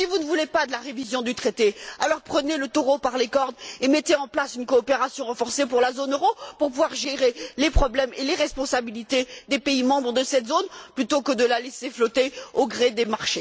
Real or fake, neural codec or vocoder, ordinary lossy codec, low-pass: real; none; none; none